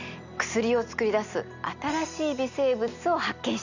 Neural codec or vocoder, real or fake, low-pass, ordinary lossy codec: none; real; 7.2 kHz; none